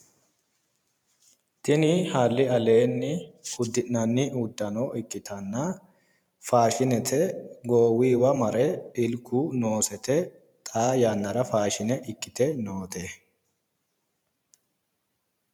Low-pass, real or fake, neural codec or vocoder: 19.8 kHz; fake; vocoder, 44.1 kHz, 128 mel bands every 512 samples, BigVGAN v2